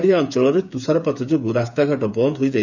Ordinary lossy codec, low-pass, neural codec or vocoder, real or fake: none; 7.2 kHz; codec, 16 kHz, 8 kbps, FreqCodec, smaller model; fake